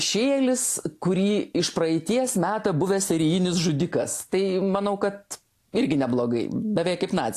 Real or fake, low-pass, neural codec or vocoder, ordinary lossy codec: real; 14.4 kHz; none; AAC, 64 kbps